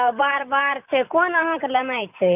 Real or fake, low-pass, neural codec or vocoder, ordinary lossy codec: fake; 3.6 kHz; vocoder, 44.1 kHz, 128 mel bands every 512 samples, BigVGAN v2; none